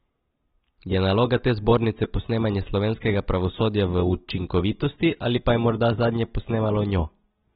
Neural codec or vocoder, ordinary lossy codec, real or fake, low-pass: none; AAC, 16 kbps; real; 19.8 kHz